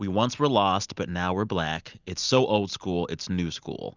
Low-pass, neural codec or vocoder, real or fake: 7.2 kHz; none; real